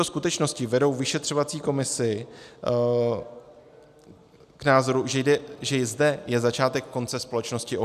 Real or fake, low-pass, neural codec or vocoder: real; 14.4 kHz; none